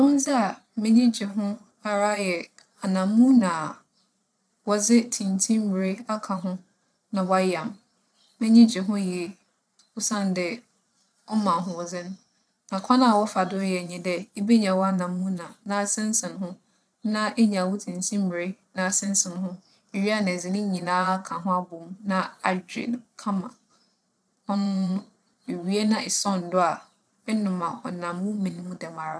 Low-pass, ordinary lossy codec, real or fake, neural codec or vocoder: none; none; fake; vocoder, 22.05 kHz, 80 mel bands, Vocos